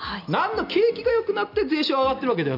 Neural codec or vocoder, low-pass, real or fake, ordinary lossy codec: none; 5.4 kHz; real; none